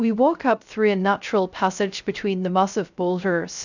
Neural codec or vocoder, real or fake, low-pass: codec, 16 kHz, 0.3 kbps, FocalCodec; fake; 7.2 kHz